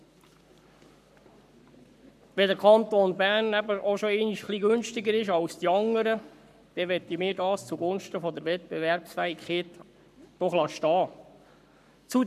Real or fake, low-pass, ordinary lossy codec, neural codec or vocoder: fake; 14.4 kHz; none; codec, 44.1 kHz, 7.8 kbps, Pupu-Codec